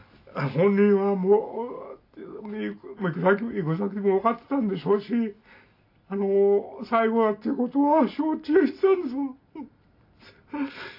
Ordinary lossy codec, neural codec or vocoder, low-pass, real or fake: AAC, 32 kbps; none; 5.4 kHz; real